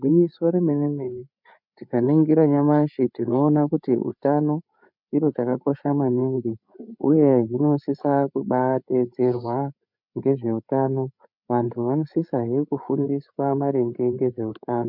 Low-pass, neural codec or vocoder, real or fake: 5.4 kHz; codec, 16 kHz, 8 kbps, FreqCodec, larger model; fake